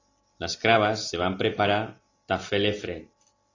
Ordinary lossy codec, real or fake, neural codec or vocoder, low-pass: AAC, 32 kbps; real; none; 7.2 kHz